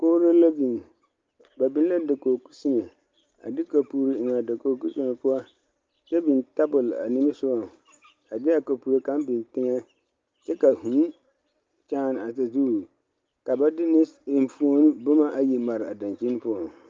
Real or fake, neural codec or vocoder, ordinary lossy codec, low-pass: real; none; Opus, 32 kbps; 7.2 kHz